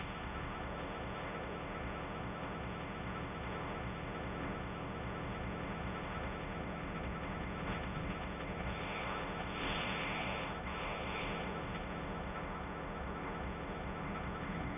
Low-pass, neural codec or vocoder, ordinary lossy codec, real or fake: 3.6 kHz; codec, 16 kHz, 1.1 kbps, Voila-Tokenizer; none; fake